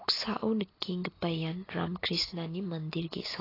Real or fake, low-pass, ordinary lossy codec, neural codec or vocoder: real; 5.4 kHz; AAC, 24 kbps; none